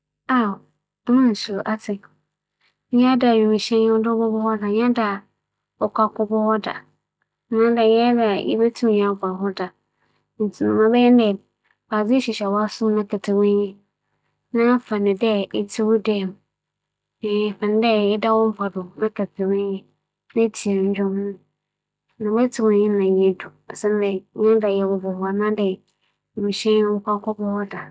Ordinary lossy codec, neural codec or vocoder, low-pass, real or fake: none; none; none; real